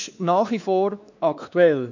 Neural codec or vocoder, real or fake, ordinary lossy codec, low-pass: codec, 16 kHz, 2 kbps, X-Codec, WavLM features, trained on Multilingual LibriSpeech; fake; none; 7.2 kHz